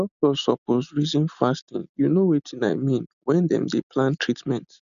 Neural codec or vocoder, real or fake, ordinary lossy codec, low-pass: none; real; none; 7.2 kHz